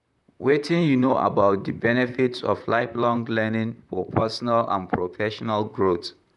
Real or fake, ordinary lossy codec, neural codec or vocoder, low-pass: fake; none; vocoder, 44.1 kHz, 128 mel bands, Pupu-Vocoder; 10.8 kHz